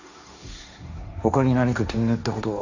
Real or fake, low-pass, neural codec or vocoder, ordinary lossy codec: fake; 7.2 kHz; codec, 16 kHz, 1.1 kbps, Voila-Tokenizer; none